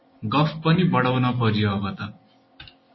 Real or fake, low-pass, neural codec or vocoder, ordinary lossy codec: real; 7.2 kHz; none; MP3, 24 kbps